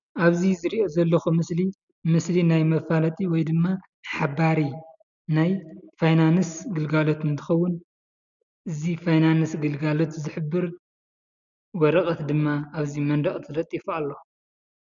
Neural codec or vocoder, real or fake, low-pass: none; real; 7.2 kHz